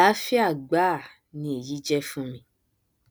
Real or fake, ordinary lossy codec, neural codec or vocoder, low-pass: real; none; none; none